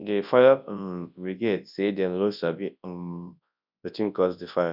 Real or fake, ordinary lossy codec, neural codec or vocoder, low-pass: fake; none; codec, 24 kHz, 0.9 kbps, WavTokenizer, large speech release; 5.4 kHz